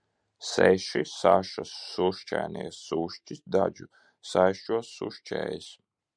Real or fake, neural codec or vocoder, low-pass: real; none; 9.9 kHz